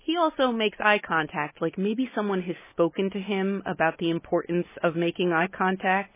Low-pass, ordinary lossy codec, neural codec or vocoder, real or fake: 3.6 kHz; MP3, 16 kbps; none; real